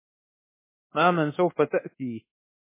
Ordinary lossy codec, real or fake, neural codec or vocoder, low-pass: MP3, 16 kbps; fake; codec, 24 kHz, 1.2 kbps, DualCodec; 3.6 kHz